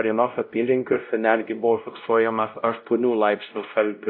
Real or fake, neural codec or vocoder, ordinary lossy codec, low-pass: fake; codec, 16 kHz, 0.5 kbps, X-Codec, WavLM features, trained on Multilingual LibriSpeech; AAC, 48 kbps; 5.4 kHz